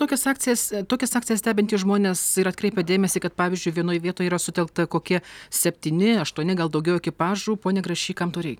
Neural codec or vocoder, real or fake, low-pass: none; real; 19.8 kHz